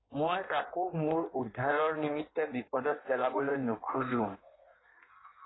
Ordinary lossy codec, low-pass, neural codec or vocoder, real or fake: AAC, 16 kbps; 7.2 kHz; codec, 16 kHz in and 24 kHz out, 1.1 kbps, FireRedTTS-2 codec; fake